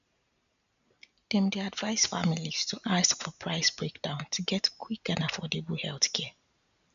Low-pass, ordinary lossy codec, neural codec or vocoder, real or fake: 7.2 kHz; none; none; real